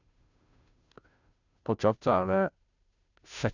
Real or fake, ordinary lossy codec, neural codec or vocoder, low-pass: fake; none; codec, 16 kHz, 0.5 kbps, FunCodec, trained on Chinese and English, 25 frames a second; 7.2 kHz